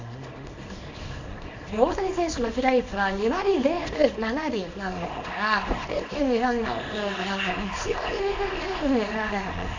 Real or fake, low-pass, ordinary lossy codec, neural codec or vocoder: fake; 7.2 kHz; AAC, 48 kbps; codec, 24 kHz, 0.9 kbps, WavTokenizer, small release